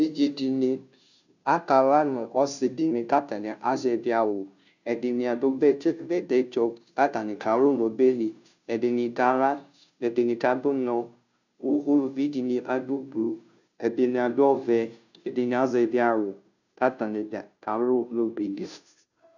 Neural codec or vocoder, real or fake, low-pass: codec, 16 kHz, 0.5 kbps, FunCodec, trained on Chinese and English, 25 frames a second; fake; 7.2 kHz